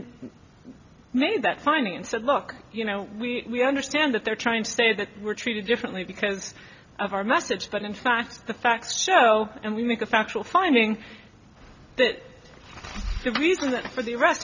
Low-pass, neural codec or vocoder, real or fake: 7.2 kHz; none; real